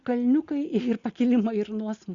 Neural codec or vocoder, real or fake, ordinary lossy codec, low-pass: none; real; Opus, 64 kbps; 7.2 kHz